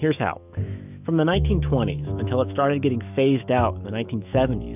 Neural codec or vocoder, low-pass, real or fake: autoencoder, 48 kHz, 128 numbers a frame, DAC-VAE, trained on Japanese speech; 3.6 kHz; fake